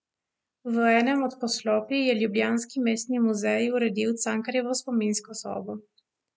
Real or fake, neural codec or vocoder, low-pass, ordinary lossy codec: real; none; none; none